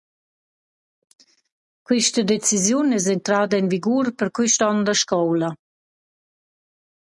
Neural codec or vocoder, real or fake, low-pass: none; real; 10.8 kHz